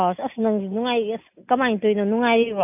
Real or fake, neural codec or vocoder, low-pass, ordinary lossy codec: real; none; 3.6 kHz; none